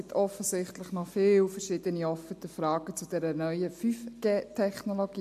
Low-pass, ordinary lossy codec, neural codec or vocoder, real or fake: 14.4 kHz; MP3, 64 kbps; none; real